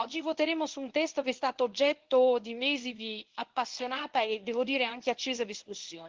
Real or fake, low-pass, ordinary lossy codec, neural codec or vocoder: fake; 7.2 kHz; Opus, 16 kbps; codec, 24 kHz, 0.9 kbps, WavTokenizer, medium speech release version 1